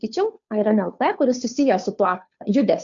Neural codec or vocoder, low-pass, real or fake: codec, 16 kHz, 2 kbps, FunCodec, trained on Chinese and English, 25 frames a second; 7.2 kHz; fake